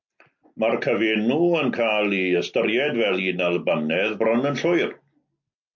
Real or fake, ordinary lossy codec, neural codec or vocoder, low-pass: real; MP3, 48 kbps; none; 7.2 kHz